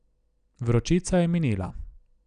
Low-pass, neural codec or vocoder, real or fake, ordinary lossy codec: 9.9 kHz; none; real; none